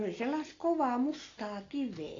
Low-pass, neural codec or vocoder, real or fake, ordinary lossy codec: 7.2 kHz; none; real; AAC, 32 kbps